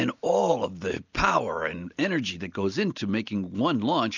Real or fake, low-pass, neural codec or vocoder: real; 7.2 kHz; none